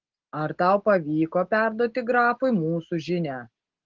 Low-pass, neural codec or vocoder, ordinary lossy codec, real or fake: 7.2 kHz; none; Opus, 16 kbps; real